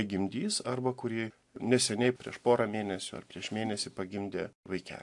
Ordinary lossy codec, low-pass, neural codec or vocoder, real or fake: AAC, 64 kbps; 10.8 kHz; none; real